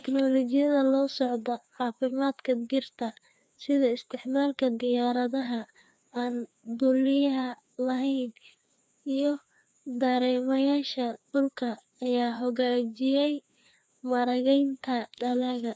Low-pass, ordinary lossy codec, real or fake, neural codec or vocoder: none; none; fake; codec, 16 kHz, 2 kbps, FreqCodec, larger model